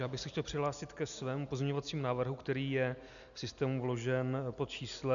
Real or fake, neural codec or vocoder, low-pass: real; none; 7.2 kHz